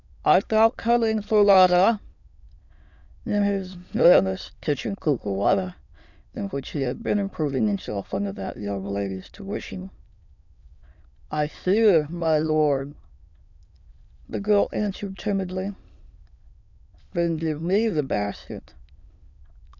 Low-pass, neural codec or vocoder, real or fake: 7.2 kHz; autoencoder, 22.05 kHz, a latent of 192 numbers a frame, VITS, trained on many speakers; fake